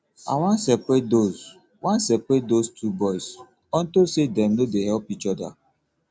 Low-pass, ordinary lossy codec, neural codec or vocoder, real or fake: none; none; none; real